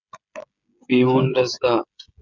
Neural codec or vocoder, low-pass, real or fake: codec, 16 kHz, 16 kbps, FreqCodec, smaller model; 7.2 kHz; fake